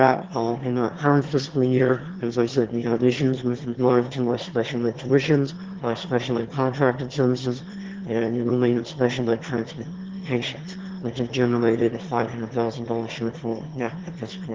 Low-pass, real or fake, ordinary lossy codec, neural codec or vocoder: 7.2 kHz; fake; Opus, 16 kbps; autoencoder, 22.05 kHz, a latent of 192 numbers a frame, VITS, trained on one speaker